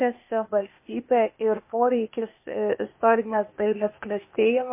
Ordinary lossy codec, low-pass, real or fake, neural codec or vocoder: MP3, 24 kbps; 3.6 kHz; fake; codec, 16 kHz, 0.8 kbps, ZipCodec